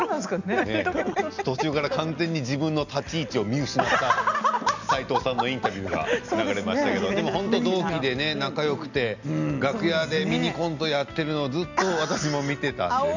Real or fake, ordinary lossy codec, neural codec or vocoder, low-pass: real; none; none; 7.2 kHz